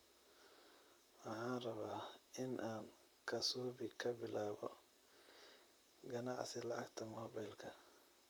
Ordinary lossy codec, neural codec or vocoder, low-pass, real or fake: none; vocoder, 44.1 kHz, 128 mel bands, Pupu-Vocoder; none; fake